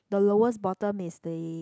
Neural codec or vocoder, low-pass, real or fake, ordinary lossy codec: none; none; real; none